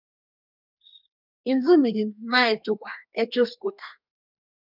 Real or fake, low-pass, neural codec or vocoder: fake; 5.4 kHz; codec, 32 kHz, 1.9 kbps, SNAC